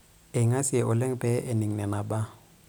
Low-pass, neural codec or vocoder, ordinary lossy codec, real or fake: none; none; none; real